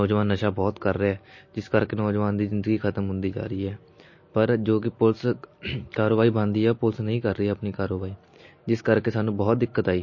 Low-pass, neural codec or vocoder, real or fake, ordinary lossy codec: 7.2 kHz; none; real; MP3, 32 kbps